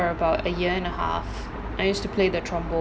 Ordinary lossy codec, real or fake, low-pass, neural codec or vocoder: none; real; none; none